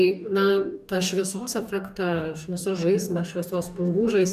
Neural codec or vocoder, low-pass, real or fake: codec, 44.1 kHz, 2.6 kbps, DAC; 14.4 kHz; fake